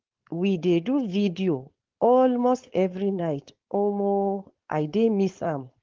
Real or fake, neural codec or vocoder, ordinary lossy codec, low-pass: fake; codec, 16 kHz, 4.8 kbps, FACodec; Opus, 16 kbps; 7.2 kHz